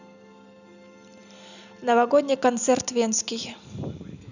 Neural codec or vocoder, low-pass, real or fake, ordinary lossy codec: none; 7.2 kHz; real; none